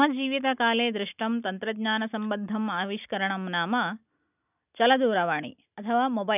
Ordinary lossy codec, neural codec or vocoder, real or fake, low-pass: none; none; real; 3.6 kHz